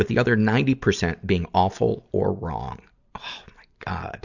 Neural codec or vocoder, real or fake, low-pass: none; real; 7.2 kHz